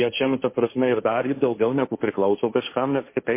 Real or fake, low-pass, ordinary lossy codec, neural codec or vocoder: fake; 3.6 kHz; MP3, 24 kbps; codec, 16 kHz, 1.1 kbps, Voila-Tokenizer